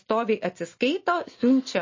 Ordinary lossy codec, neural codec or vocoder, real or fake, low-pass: MP3, 32 kbps; none; real; 7.2 kHz